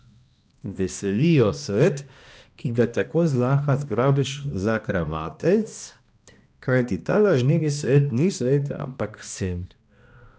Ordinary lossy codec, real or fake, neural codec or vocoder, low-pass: none; fake; codec, 16 kHz, 1 kbps, X-Codec, HuBERT features, trained on balanced general audio; none